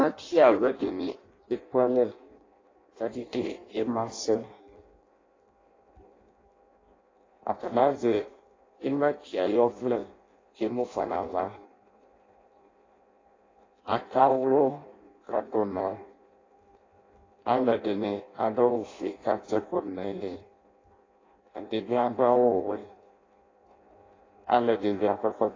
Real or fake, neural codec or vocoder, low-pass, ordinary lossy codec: fake; codec, 16 kHz in and 24 kHz out, 0.6 kbps, FireRedTTS-2 codec; 7.2 kHz; AAC, 32 kbps